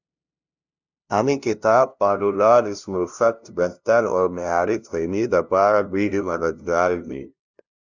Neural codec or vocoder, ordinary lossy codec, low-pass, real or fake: codec, 16 kHz, 0.5 kbps, FunCodec, trained on LibriTTS, 25 frames a second; Opus, 64 kbps; 7.2 kHz; fake